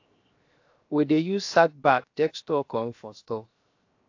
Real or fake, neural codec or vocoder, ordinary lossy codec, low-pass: fake; codec, 16 kHz, 0.7 kbps, FocalCodec; AAC, 48 kbps; 7.2 kHz